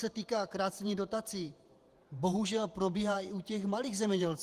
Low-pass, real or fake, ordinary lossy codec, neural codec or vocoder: 14.4 kHz; fake; Opus, 24 kbps; vocoder, 48 kHz, 128 mel bands, Vocos